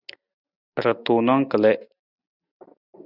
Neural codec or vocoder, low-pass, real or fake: none; 5.4 kHz; real